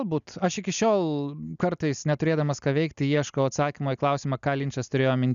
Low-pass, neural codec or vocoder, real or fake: 7.2 kHz; none; real